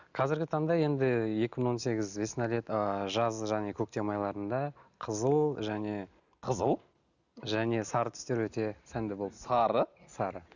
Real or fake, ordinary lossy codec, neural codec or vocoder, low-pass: real; none; none; 7.2 kHz